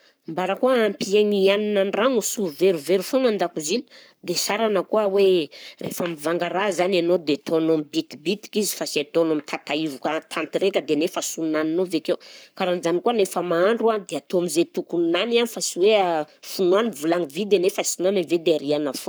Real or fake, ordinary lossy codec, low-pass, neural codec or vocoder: fake; none; none; codec, 44.1 kHz, 7.8 kbps, Pupu-Codec